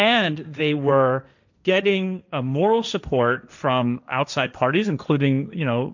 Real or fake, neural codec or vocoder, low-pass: fake; codec, 16 kHz, 1.1 kbps, Voila-Tokenizer; 7.2 kHz